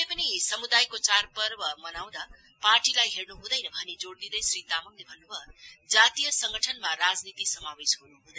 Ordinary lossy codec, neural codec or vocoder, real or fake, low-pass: none; none; real; none